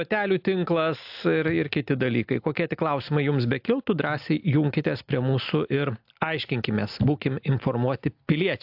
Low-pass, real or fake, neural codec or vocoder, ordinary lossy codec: 5.4 kHz; real; none; AAC, 48 kbps